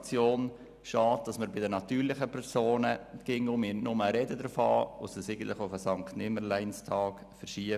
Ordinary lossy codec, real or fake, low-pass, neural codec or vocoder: none; real; 14.4 kHz; none